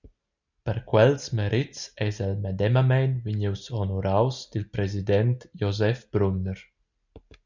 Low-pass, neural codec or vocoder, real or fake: 7.2 kHz; none; real